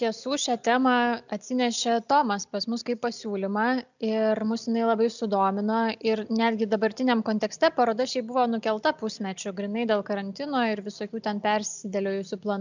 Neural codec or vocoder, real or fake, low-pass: none; real; 7.2 kHz